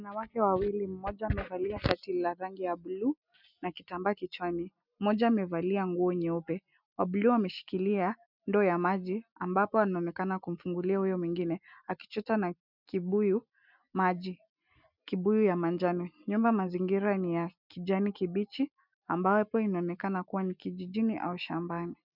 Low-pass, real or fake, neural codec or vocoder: 5.4 kHz; real; none